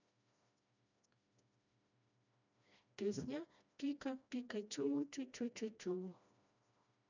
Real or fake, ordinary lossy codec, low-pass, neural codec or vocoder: fake; none; 7.2 kHz; codec, 16 kHz, 1 kbps, FreqCodec, smaller model